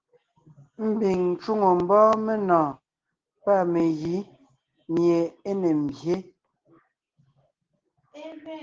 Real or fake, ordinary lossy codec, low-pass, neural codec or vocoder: real; Opus, 16 kbps; 7.2 kHz; none